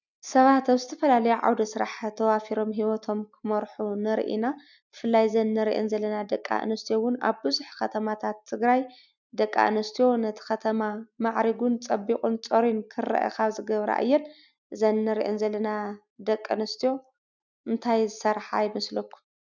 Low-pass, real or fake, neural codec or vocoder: 7.2 kHz; real; none